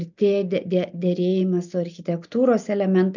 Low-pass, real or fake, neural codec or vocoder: 7.2 kHz; real; none